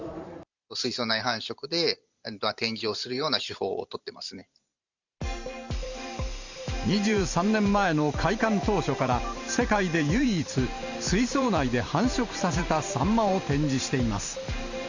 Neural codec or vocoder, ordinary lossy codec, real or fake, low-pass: vocoder, 44.1 kHz, 128 mel bands every 512 samples, BigVGAN v2; Opus, 64 kbps; fake; 7.2 kHz